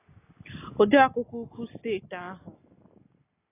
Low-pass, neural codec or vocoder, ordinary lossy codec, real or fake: 3.6 kHz; none; AAC, 16 kbps; real